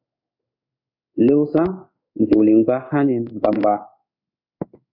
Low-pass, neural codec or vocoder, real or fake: 5.4 kHz; codec, 16 kHz in and 24 kHz out, 1 kbps, XY-Tokenizer; fake